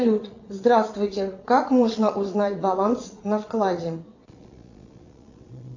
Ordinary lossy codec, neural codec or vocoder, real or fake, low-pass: AAC, 48 kbps; vocoder, 22.05 kHz, 80 mel bands, Vocos; fake; 7.2 kHz